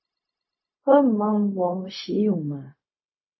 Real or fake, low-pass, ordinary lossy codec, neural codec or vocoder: fake; 7.2 kHz; MP3, 24 kbps; codec, 16 kHz, 0.4 kbps, LongCat-Audio-Codec